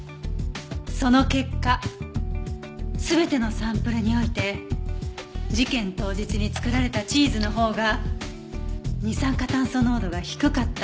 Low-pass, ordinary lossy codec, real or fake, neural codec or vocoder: none; none; real; none